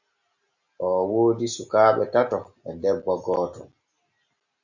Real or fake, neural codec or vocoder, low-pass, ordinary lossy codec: real; none; 7.2 kHz; Opus, 64 kbps